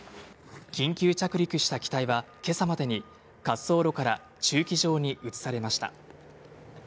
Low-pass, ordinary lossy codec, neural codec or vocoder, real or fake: none; none; none; real